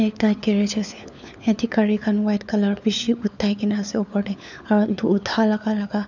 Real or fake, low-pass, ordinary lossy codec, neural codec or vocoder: fake; 7.2 kHz; none; codec, 16 kHz, 4 kbps, FunCodec, trained on LibriTTS, 50 frames a second